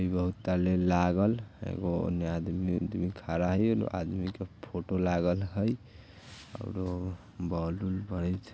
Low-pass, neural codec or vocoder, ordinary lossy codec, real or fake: none; none; none; real